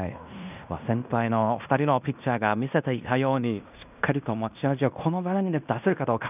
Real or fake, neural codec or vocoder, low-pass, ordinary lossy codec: fake; codec, 16 kHz in and 24 kHz out, 0.9 kbps, LongCat-Audio-Codec, fine tuned four codebook decoder; 3.6 kHz; none